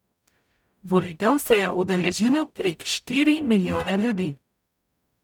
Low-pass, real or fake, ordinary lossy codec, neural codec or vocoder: 19.8 kHz; fake; none; codec, 44.1 kHz, 0.9 kbps, DAC